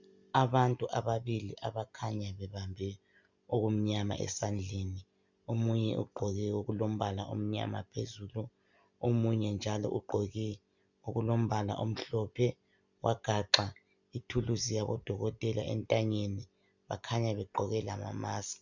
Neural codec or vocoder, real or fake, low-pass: none; real; 7.2 kHz